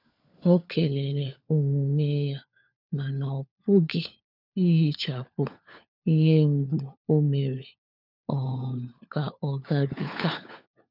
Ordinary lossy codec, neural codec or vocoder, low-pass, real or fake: none; codec, 16 kHz, 4 kbps, FunCodec, trained on LibriTTS, 50 frames a second; 5.4 kHz; fake